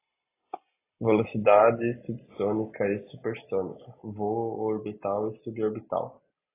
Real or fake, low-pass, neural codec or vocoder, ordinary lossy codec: real; 3.6 kHz; none; AAC, 24 kbps